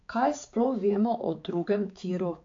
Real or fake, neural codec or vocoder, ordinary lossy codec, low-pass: fake; codec, 16 kHz, 4 kbps, X-Codec, HuBERT features, trained on balanced general audio; AAC, 48 kbps; 7.2 kHz